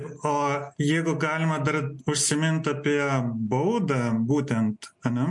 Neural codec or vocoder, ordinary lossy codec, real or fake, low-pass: none; MP3, 64 kbps; real; 10.8 kHz